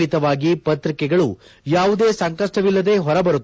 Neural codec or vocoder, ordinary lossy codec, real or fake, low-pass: none; none; real; none